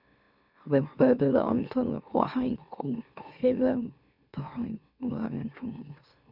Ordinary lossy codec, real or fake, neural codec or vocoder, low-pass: none; fake; autoencoder, 44.1 kHz, a latent of 192 numbers a frame, MeloTTS; 5.4 kHz